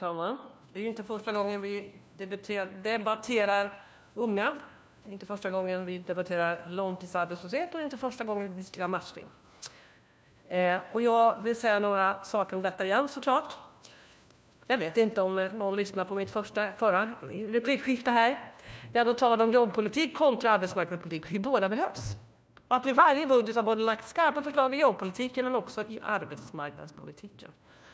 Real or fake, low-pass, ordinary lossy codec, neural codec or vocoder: fake; none; none; codec, 16 kHz, 1 kbps, FunCodec, trained on LibriTTS, 50 frames a second